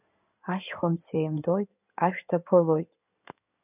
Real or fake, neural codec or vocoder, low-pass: fake; codec, 16 kHz in and 24 kHz out, 2.2 kbps, FireRedTTS-2 codec; 3.6 kHz